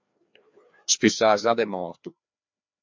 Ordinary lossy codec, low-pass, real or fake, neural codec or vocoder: MP3, 48 kbps; 7.2 kHz; fake; codec, 16 kHz, 2 kbps, FreqCodec, larger model